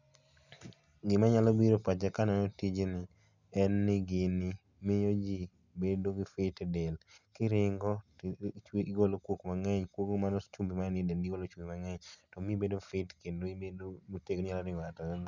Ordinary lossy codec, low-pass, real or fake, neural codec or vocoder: none; 7.2 kHz; real; none